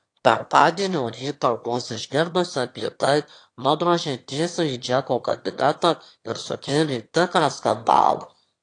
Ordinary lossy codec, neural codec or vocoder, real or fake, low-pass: AAC, 48 kbps; autoencoder, 22.05 kHz, a latent of 192 numbers a frame, VITS, trained on one speaker; fake; 9.9 kHz